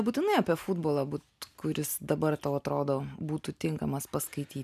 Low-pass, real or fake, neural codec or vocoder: 14.4 kHz; real; none